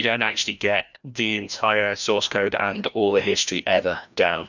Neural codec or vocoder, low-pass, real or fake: codec, 16 kHz, 1 kbps, FreqCodec, larger model; 7.2 kHz; fake